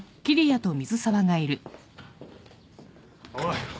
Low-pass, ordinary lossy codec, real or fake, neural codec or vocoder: none; none; real; none